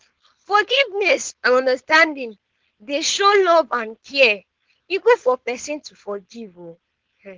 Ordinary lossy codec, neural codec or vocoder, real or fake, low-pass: Opus, 16 kbps; codec, 16 kHz, 4.8 kbps, FACodec; fake; 7.2 kHz